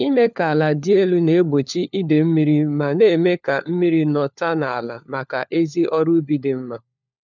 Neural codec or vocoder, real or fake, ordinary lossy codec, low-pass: codec, 16 kHz, 4 kbps, FunCodec, trained on LibriTTS, 50 frames a second; fake; none; 7.2 kHz